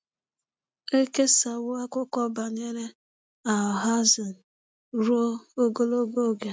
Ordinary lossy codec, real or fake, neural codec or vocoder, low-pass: none; real; none; none